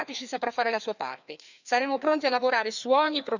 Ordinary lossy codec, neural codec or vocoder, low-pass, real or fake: none; codec, 16 kHz, 2 kbps, FreqCodec, larger model; 7.2 kHz; fake